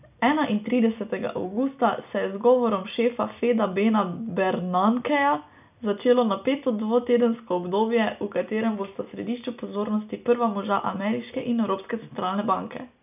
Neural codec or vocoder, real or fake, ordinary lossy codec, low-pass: none; real; none; 3.6 kHz